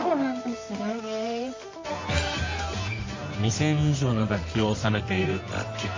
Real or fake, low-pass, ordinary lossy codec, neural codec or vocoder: fake; 7.2 kHz; MP3, 32 kbps; codec, 24 kHz, 0.9 kbps, WavTokenizer, medium music audio release